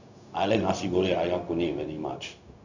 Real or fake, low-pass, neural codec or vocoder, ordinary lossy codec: fake; 7.2 kHz; codec, 16 kHz, 0.4 kbps, LongCat-Audio-Codec; none